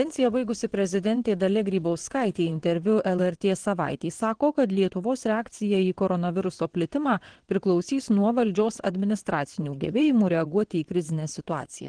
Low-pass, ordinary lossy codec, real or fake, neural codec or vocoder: 9.9 kHz; Opus, 16 kbps; fake; vocoder, 22.05 kHz, 80 mel bands, WaveNeXt